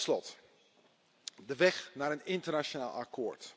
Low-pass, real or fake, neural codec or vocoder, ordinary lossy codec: none; real; none; none